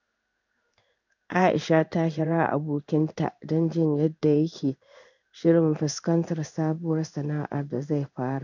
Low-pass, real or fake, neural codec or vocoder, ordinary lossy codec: 7.2 kHz; fake; codec, 16 kHz in and 24 kHz out, 1 kbps, XY-Tokenizer; none